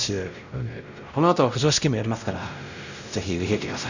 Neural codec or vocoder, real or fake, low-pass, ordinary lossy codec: codec, 16 kHz, 0.5 kbps, X-Codec, WavLM features, trained on Multilingual LibriSpeech; fake; 7.2 kHz; none